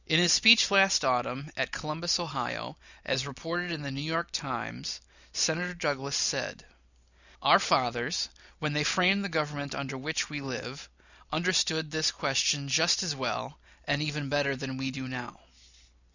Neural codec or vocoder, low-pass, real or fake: none; 7.2 kHz; real